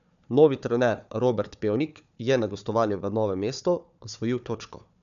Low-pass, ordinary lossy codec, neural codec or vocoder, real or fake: 7.2 kHz; none; codec, 16 kHz, 4 kbps, FunCodec, trained on Chinese and English, 50 frames a second; fake